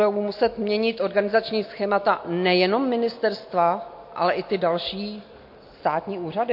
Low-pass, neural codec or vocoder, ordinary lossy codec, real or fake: 5.4 kHz; none; MP3, 32 kbps; real